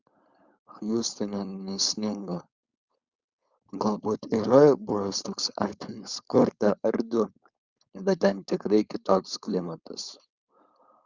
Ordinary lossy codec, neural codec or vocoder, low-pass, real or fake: Opus, 64 kbps; codec, 16 kHz, 4.8 kbps, FACodec; 7.2 kHz; fake